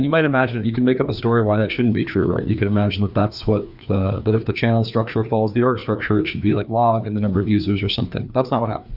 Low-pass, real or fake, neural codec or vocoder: 5.4 kHz; fake; codec, 16 kHz, 2 kbps, FreqCodec, larger model